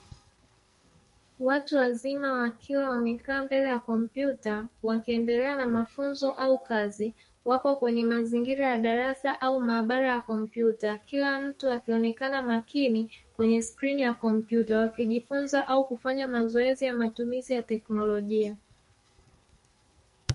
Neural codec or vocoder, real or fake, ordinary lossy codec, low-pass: codec, 44.1 kHz, 2.6 kbps, SNAC; fake; MP3, 48 kbps; 14.4 kHz